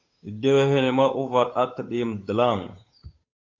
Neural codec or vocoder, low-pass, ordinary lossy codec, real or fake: codec, 16 kHz, 8 kbps, FunCodec, trained on Chinese and English, 25 frames a second; 7.2 kHz; AAC, 48 kbps; fake